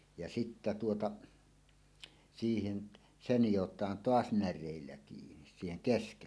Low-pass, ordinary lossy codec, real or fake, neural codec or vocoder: none; none; real; none